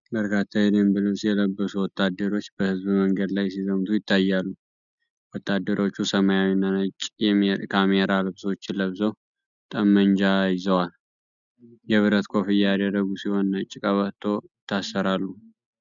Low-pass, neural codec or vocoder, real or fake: 7.2 kHz; none; real